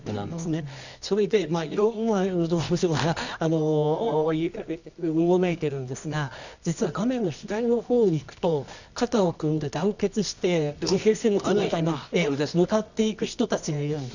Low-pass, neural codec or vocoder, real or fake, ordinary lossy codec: 7.2 kHz; codec, 24 kHz, 0.9 kbps, WavTokenizer, medium music audio release; fake; none